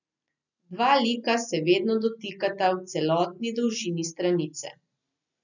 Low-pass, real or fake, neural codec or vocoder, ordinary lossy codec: 7.2 kHz; real; none; none